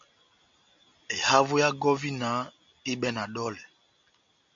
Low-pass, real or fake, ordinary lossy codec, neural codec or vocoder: 7.2 kHz; real; AAC, 64 kbps; none